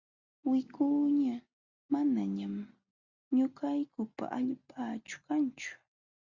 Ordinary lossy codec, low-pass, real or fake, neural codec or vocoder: Opus, 64 kbps; 7.2 kHz; real; none